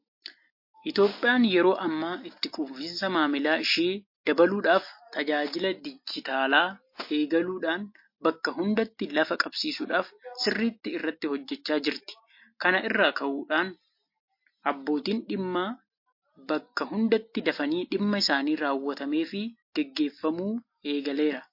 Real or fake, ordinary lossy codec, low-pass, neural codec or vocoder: real; MP3, 32 kbps; 5.4 kHz; none